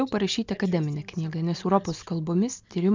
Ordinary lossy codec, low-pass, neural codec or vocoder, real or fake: MP3, 64 kbps; 7.2 kHz; none; real